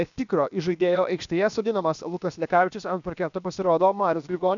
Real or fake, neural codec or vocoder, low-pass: fake; codec, 16 kHz, about 1 kbps, DyCAST, with the encoder's durations; 7.2 kHz